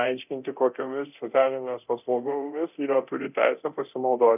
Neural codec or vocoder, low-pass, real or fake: codec, 24 kHz, 0.9 kbps, DualCodec; 3.6 kHz; fake